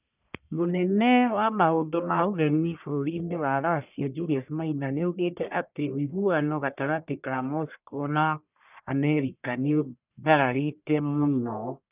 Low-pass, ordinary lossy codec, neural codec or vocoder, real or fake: 3.6 kHz; none; codec, 44.1 kHz, 1.7 kbps, Pupu-Codec; fake